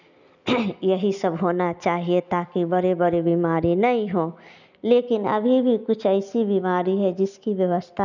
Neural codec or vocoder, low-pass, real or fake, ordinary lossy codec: none; 7.2 kHz; real; none